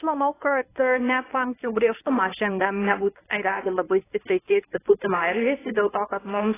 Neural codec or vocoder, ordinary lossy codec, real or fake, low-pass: codec, 24 kHz, 0.9 kbps, WavTokenizer, medium speech release version 1; AAC, 16 kbps; fake; 3.6 kHz